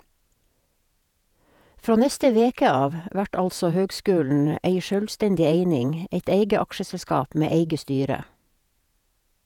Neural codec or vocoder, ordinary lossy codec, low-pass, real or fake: vocoder, 48 kHz, 128 mel bands, Vocos; none; 19.8 kHz; fake